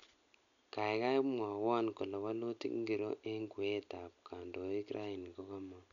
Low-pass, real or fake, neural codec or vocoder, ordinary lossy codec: 7.2 kHz; real; none; none